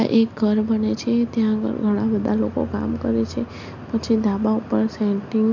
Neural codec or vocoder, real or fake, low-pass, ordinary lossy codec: none; real; 7.2 kHz; MP3, 48 kbps